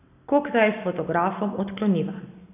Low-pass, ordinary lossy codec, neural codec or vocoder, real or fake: 3.6 kHz; none; none; real